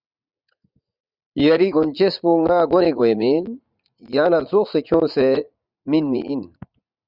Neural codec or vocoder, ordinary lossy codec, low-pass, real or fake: vocoder, 44.1 kHz, 80 mel bands, Vocos; Opus, 64 kbps; 5.4 kHz; fake